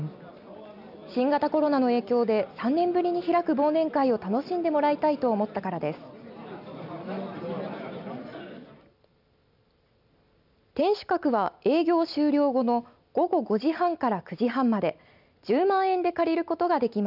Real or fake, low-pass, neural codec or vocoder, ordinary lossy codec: real; 5.4 kHz; none; none